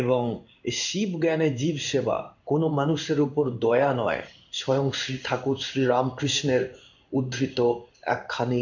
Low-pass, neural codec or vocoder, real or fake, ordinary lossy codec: 7.2 kHz; codec, 16 kHz in and 24 kHz out, 1 kbps, XY-Tokenizer; fake; none